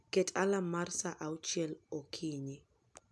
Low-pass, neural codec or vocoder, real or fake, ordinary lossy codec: none; none; real; none